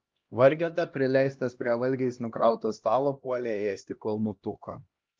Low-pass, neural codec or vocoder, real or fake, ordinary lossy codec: 7.2 kHz; codec, 16 kHz, 1 kbps, X-Codec, HuBERT features, trained on LibriSpeech; fake; Opus, 24 kbps